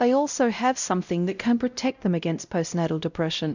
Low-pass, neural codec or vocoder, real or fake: 7.2 kHz; codec, 16 kHz, 0.5 kbps, X-Codec, WavLM features, trained on Multilingual LibriSpeech; fake